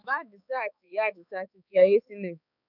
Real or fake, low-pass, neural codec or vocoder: fake; 5.4 kHz; codec, 16 kHz, 4 kbps, X-Codec, HuBERT features, trained on balanced general audio